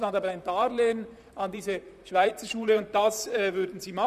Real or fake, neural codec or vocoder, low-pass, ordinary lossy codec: fake; vocoder, 44.1 kHz, 128 mel bands, Pupu-Vocoder; 14.4 kHz; none